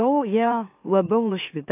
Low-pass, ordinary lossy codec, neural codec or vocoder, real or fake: 3.6 kHz; AAC, 32 kbps; autoencoder, 44.1 kHz, a latent of 192 numbers a frame, MeloTTS; fake